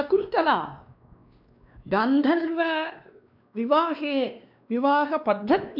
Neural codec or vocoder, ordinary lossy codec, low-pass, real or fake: codec, 16 kHz, 2 kbps, X-Codec, WavLM features, trained on Multilingual LibriSpeech; MP3, 48 kbps; 5.4 kHz; fake